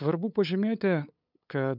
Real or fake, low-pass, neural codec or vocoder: fake; 5.4 kHz; codec, 16 kHz, 8 kbps, FunCodec, trained on LibriTTS, 25 frames a second